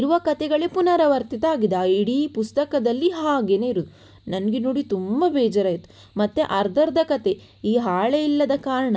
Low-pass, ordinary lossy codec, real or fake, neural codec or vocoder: none; none; real; none